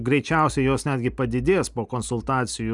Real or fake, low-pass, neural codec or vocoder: real; 10.8 kHz; none